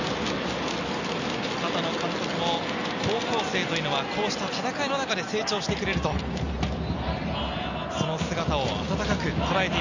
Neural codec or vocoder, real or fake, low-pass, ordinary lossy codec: none; real; 7.2 kHz; none